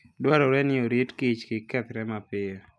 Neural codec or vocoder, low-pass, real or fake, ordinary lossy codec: none; none; real; none